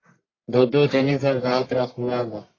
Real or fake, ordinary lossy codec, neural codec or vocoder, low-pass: fake; AAC, 32 kbps; codec, 44.1 kHz, 1.7 kbps, Pupu-Codec; 7.2 kHz